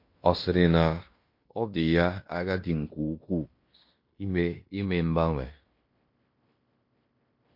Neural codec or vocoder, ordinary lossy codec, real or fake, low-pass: codec, 16 kHz in and 24 kHz out, 0.9 kbps, LongCat-Audio-Codec, fine tuned four codebook decoder; MP3, 32 kbps; fake; 5.4 kHz